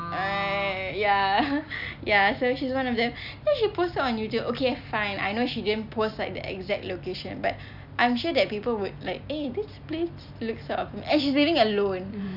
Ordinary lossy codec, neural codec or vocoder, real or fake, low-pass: none; none; real; 5.4 kHz